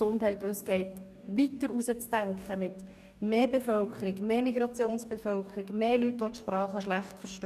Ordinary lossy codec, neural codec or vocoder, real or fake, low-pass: none; codec, 44.1 kHz, 2.6 kbps, DAC; fake; 14.4 kHz